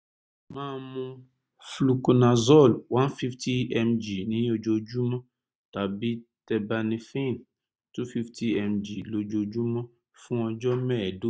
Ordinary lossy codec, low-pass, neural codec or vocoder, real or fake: none; none; none; real